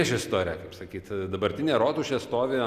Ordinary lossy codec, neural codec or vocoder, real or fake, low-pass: Opus, 64 kbps; none; real; 14.4 kHz